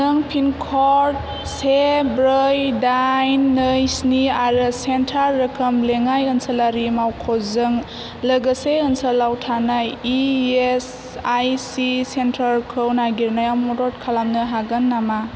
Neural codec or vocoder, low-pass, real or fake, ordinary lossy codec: none; none; real; none